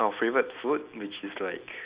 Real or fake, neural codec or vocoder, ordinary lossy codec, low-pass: real; none; Opus, 64 kbps; 3.6 kHz